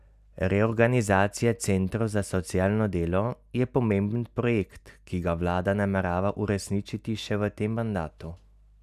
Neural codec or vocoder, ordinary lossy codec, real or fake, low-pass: none; none; real; 14.4 kHz